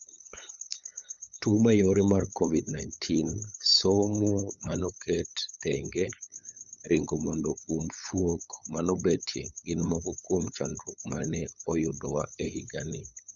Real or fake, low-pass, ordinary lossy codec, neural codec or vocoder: fake; 7.2 kHz; Opus, 64 kbps; codec, 16 kHz, 8 kbps, FunCodec, trained on LibriTTS, 25 frames a second